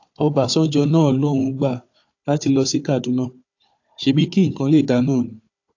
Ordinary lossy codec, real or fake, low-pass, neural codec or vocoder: AAC, 48 kbps; fake; 7.2 kHz; codec, 16 kHz, 4 kbps, FunCodec, trained on Chinese and English, 50 frames a second